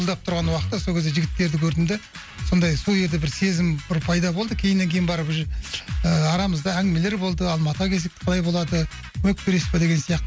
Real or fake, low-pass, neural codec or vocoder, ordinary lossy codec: real; none; none; none